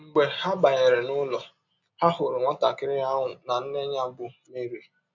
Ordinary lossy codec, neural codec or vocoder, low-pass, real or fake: none; none; 7.2 kHz; real